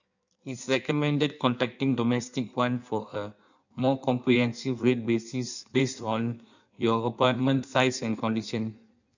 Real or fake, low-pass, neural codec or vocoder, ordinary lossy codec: fake; 7.2 kHz; codec, 16 kHz in and 24 kHz out, 1.1 kbps, FireRedTTS-2 codec; none